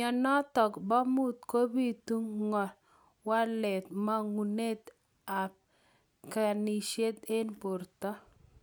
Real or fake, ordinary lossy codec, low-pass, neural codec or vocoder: real; none; none; none